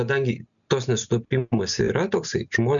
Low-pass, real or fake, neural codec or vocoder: 7.2 kHz; real; none